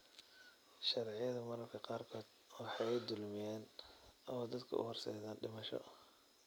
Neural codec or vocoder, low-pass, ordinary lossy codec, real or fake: none; none; none; real